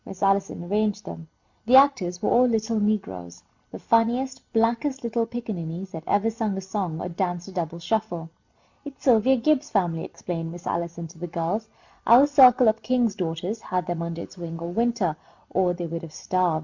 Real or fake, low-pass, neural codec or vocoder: real; 7.2 kHz; none